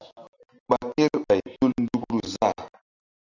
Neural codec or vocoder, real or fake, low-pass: none; real; 7.2 kHz